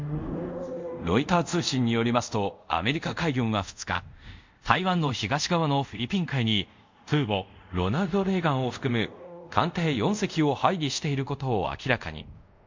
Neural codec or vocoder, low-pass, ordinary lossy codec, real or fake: codec, 24 kHz, 0.5 kbps, DualCodec; 7.2 kHz; MP3, 64 kbps; fake